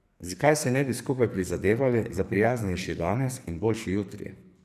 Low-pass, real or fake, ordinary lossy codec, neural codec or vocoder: 14.4 kHz; fake; none; codec, 44.1 kHz, 2.6 kbps, SNAC